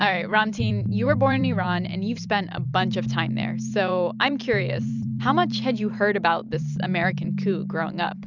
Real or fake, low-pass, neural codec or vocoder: fake; 7.2 kHz; vocoder, 44.1 kHz, 128 mel bands every 256 samples, BigVGAN v2